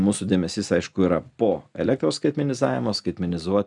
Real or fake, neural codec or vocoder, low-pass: real; none; 10.8 kHz